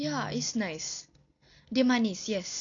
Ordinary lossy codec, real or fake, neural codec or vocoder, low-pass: none; real; none; 7.2 kHz